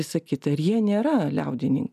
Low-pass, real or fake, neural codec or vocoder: 14.4 kHz; real; none